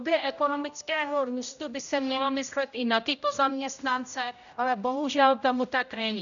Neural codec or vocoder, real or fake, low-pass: codec, 16 kHz, 0.5 kbps, X-Codec, HuBERT features, trained on general audio; fake; 7.2 kHz